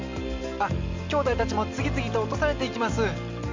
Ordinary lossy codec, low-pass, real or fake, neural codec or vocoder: MP3, 64 kbps; 7.2 kHz; real; none